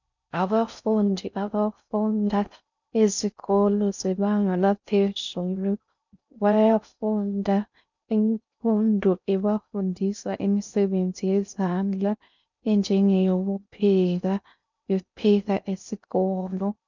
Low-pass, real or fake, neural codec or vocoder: 7.2 kHz; fake; codec, 16 kHz in and 24 kHz out, 0.6 kbps, FocalCodec, streaming, 4096 codes